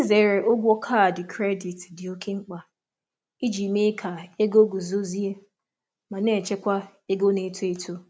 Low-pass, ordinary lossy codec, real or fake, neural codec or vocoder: none; none; real; none